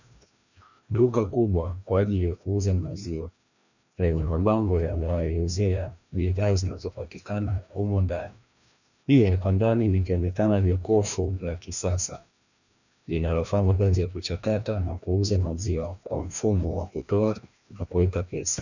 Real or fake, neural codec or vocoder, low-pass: fake; codec, 16 kHz, 1 kbps, FreqCodec, larger model; 7.2 kHz